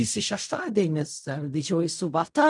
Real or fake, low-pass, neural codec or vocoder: fake; 10.8 kHz; codec, 16 kHz in and 24 kHz out, 0.4 kbps, LongCat-Audio-Codec, fine tuned four codebook decoder